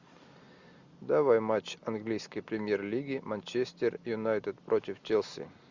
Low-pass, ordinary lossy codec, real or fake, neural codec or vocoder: 7.2 kHz; Opus, 64 kbps; real; none